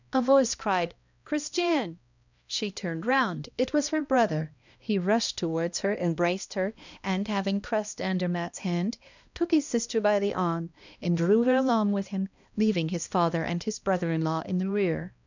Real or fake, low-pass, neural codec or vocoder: fake; 7.2 kHz; codec, 16 kHz, 1 kbps, X-Codec, HuBERT features, trained on balanced general audio